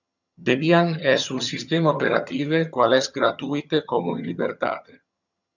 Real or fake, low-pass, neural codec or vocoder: fake; 7.2 kHz; vocoder, 22.05 kHz, 80 mel bands, HiFi-GAN